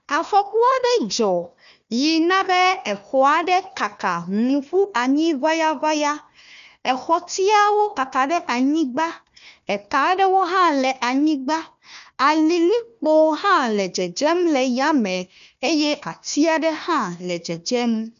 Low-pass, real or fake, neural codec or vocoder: 7.2 kHz; fake; codec, 16 kHz, 1 kbps, FunCodec, trained on Chinese and English, 50 frames a second